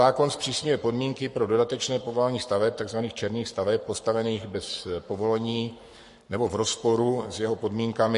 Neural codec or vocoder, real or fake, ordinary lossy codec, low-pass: codec, 44.1 kHz, 7.8 kbps, Pupu-Codec; fake; MP3, 48 kbps; 14.4 kHz